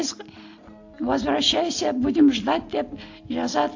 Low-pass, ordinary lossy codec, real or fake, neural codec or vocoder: 7.2 kHz; AAC, 48 kbps; real; none